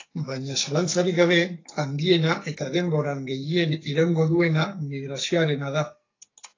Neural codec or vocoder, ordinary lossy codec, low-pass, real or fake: codec, 44.1 kHz, 2.6 kbps, SNAC; AAC, 32 kbps; 7.2 kHz; fake